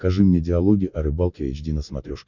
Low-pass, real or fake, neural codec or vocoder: 7.2 kHz; real; none